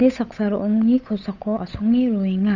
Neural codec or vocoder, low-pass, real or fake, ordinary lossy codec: codec, 16 kHz, 8 kbps, FunCodec, trained on Chinese and English, 25 frames a second; 7.2 kHz; fake; none